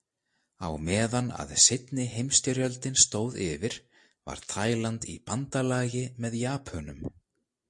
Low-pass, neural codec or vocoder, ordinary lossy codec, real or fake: 10.8 kHz; none; MP3, 48 kbps; real